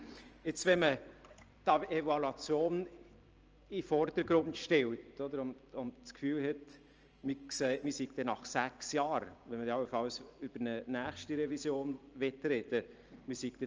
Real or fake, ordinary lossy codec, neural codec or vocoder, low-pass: fake; Opus, 24 kbps; vocoder, 44.1 kHz, 128 mel bands every 512 samples, BigVGAN v2; 7.2 kHz